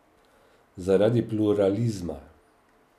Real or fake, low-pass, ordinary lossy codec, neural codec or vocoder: real; 14.4 kHz; none; none